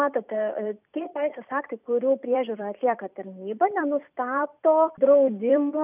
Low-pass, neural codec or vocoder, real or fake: 3.6 kHz; vocoder, 44.1 kHz, 128 mel bands every 256 samples, BigVGAN v2; fake